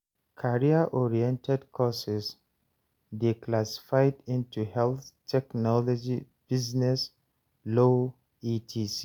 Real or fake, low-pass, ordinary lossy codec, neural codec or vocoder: real; none; none; none